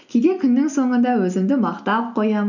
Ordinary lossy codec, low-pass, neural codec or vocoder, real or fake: none; 7.2 kHz; none; real